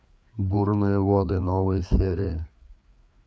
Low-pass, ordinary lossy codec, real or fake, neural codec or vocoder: none; none; fake; codec, 16 kHz, 4 kbps, FreqCodec, larger model